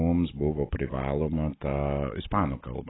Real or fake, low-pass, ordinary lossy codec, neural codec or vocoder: real; 7.2 kHz; AAC, 16 kbps; none